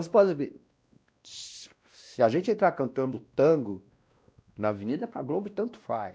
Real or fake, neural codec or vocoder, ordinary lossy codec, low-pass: fake; codec, 16 kHz, 1 kbps, X-Codec, WavLM features, trained on Multilingual LibriSpeech; none; none